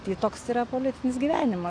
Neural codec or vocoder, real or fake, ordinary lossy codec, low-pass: none; real; AAC, 48 kbps; 9.9 kHz